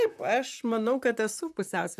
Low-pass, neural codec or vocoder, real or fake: 14.4 kHz; vocoder, 44.1 kHz, 128 mel bands, Pupu-Vocoder; fake